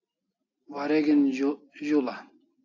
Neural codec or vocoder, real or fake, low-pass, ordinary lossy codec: none; real; 7.2 kHz; AAC, 48 kbps